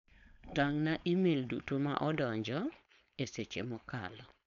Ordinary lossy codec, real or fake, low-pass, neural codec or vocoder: none; fake; 7.2 kHz; codec, 16 kHz, 4.8 kbps, FACodec